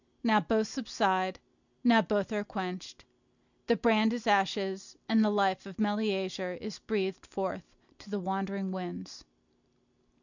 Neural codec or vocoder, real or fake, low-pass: none; real; 7.2 kHz